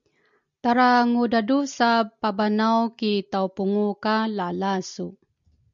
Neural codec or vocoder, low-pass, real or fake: none; 7.2 kHz; real